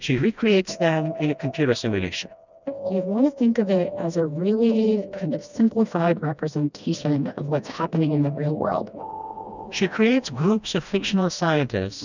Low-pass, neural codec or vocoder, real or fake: 7.2 kHz; codec, 16 kHz, 1 kbps, FreqCodec, smaller model; fake